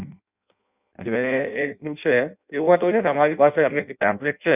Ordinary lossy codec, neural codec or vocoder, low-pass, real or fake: none; codec, 16 kHz in and 24 kHz out, 0.6 kbps, FireRedTTS-2 codec; 3.6 kHz; fake